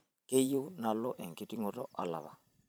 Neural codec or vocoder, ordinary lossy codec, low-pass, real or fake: vocoder, 44.1 kHz, 128 mel bands every 512 samples, BigVGAN v2; none; none; fake